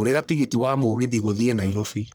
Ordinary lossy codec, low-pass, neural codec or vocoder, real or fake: none; none; codec, 44.1 kHz, 1.7 kbps, Pupu-Codec; fake